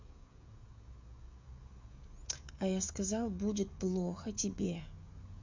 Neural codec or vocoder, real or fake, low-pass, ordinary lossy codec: codec, 16 kHz, 16 kbps, FreqCodec, smaller model; fake; 7.2 kHz; MP3, 48 kbps